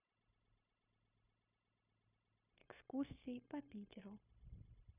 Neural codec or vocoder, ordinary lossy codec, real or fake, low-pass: codec, 16 kHz, 0.9 kbps, LongCat-Audio-Codec; none; fake; 3.6 kHz